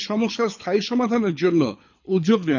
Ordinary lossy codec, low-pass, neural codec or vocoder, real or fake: none; 7.2 kHz; codec, 24 kHz, 6 kbps, HILCodec; fake